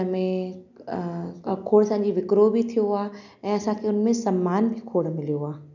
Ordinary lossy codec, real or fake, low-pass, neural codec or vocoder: none; real; 7.2 kHz; none